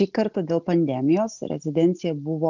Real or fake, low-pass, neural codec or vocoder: real; 7.2 kHz; none